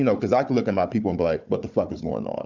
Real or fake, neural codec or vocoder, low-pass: fake; codec, 16 kHz, 4 kbps, FunCodec, trained on Chinese and English, 50 frames a second; 7.2 kHz